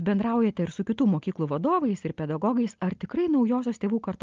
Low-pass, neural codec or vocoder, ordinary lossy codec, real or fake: 7.2 kHz; none; Opus, 32 kbps; real